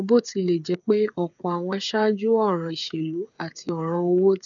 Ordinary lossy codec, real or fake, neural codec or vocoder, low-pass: none; fake; codec, 16 kHz, 8 kbps, FreqCodec, smaller model; 7.2 kHz